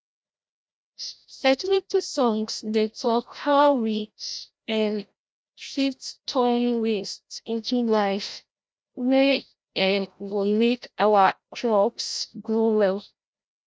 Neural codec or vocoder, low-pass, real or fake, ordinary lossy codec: codec, 16 kHz, 0.5 kbps, FreqCodec, larger model; none; fake; none